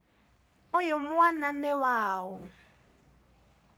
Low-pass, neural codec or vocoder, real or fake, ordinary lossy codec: none; codec, 44.1 kHz, 3.4 kbps, Pupu-Codec; fake; none